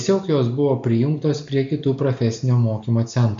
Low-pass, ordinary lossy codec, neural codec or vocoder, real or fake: 7.2 kHz; MP3, 48 kbps; none; real